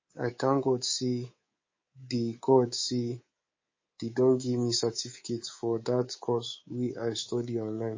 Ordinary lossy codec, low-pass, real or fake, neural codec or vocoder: MP3, 32 kbps; 7.2 kHz; fake; codec, 24 kHz, 3.1 kbps, DualCodec